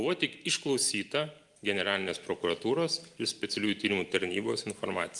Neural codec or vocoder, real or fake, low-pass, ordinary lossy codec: none; real; 10.8 kHz; Opus, 32 kbps